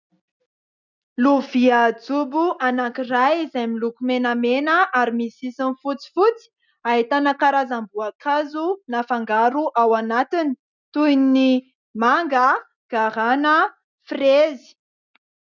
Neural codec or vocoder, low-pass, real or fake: none; 7.2 kHz; real